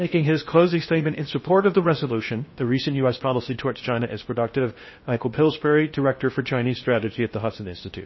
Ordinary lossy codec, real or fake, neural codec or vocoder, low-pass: MP3, 24 kbps; fake; codec, 16 kHz in and 24 kHz out, 0.6 kbps, FocalCodec, streaming, 2048 codes; 7.2 kHz